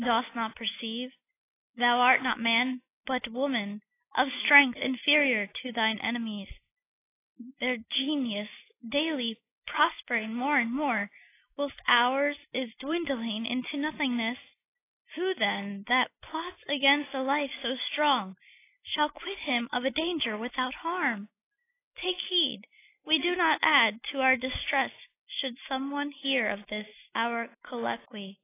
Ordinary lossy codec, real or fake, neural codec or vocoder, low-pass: AAC, 24 kbps; real; none; 3.6 kHz